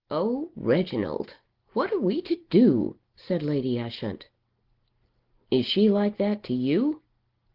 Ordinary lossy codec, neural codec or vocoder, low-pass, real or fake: Opus, 16 kbps; none; 5.4 kHz; real